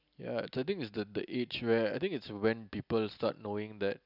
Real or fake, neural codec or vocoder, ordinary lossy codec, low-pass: real; none; none; 5.4 kHz